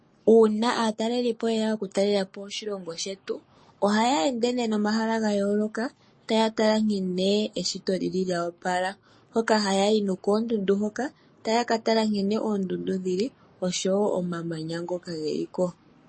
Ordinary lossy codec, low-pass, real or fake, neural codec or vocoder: MP3, 32 kbps; 9.9 kHz; fake; codec, 44.1 kHz, 7.8 kbps, Pupu-Codec